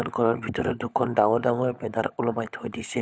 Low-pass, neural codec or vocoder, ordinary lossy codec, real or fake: none; codec, 16 kHz, 16 kbps, FunCodec, trained on LibriTTS, 50 frames a second; none; fake